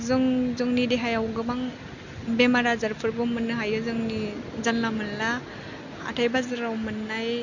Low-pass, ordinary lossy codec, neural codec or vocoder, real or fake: 7.2 kHz; none; none; real